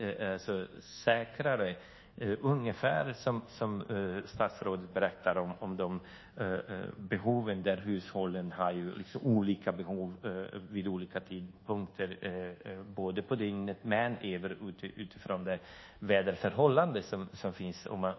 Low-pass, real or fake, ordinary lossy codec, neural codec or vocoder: 7.2 kHz; fake; MP3, 24 kbps; codec, 24 kHz, 1.2 kbps, DualCodec